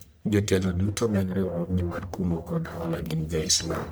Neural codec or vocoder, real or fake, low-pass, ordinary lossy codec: codec, 44.1 kHz, 1.7 kbps, Pupu-Codec; fake; none; none